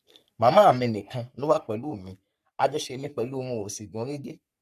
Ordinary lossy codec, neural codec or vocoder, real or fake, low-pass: none; codec, 44.1 kHz, 3.4 kbps, Pupu-Codec; fake; 14.4 kHz